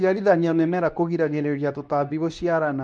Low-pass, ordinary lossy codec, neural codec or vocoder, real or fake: 9.9 kHz; none; codec, 24 kHz, 0.9 kbps, WavTokenizer, medium speech release version 2; fake